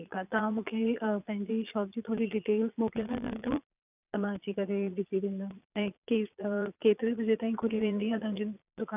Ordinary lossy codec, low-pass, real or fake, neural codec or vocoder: none; 3.6 kHz; fake; vocoder, 22.05 kHz, 80 mel bands, Vocos